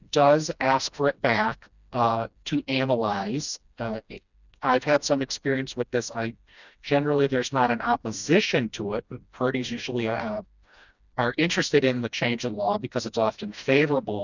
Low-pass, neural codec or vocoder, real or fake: 7.2 kHz; codec, 16 kHz, 1 kbps, FreqCodec, smaller model; fake